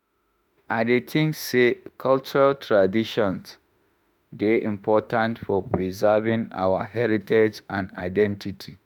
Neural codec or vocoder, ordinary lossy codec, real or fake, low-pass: autoencoder, 48 kHz, 32 numbers a frame, DAC-VAE, trained on Japanese speech; none; fake; 19.8 kHz